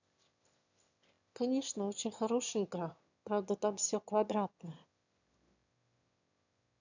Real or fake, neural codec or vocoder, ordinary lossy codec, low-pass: fake; autoencoder, 22.05 kHz, a latent of 192 numbers a frame, VITS, trained on one speaker; none; 7.2 kHz